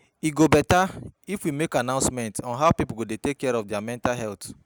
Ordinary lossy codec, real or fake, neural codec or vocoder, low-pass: none; real; none; none